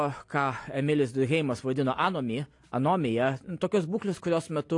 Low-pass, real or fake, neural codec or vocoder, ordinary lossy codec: 10.8 kHz; real; none; AAC, 48 kbps